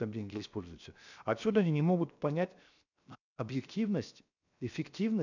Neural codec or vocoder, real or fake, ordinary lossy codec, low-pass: codec, 16 kHz, 0.7 kbps, FocalCodec; fake; none; 7.2 kHz